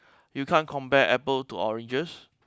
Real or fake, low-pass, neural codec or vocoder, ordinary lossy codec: real; none; none; none